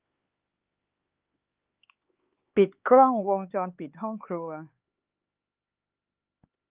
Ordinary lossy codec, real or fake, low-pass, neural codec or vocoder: Opus, 32 kbps; fake; 3.6 kHz; codec, 16 kHz, 4 kbps, X-Codec, HuBERT features, trained on LibriSpeech